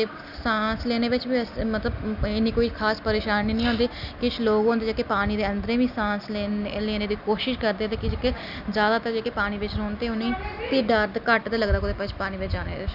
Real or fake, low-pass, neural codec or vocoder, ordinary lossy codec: real; 5.4 kHz; none; none